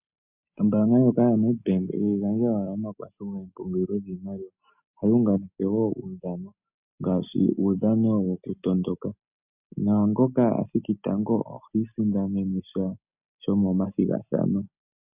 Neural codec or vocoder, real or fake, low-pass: none; real; 3.6 kHz